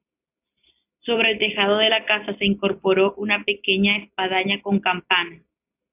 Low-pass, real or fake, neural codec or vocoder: 3.6 kHz; real; none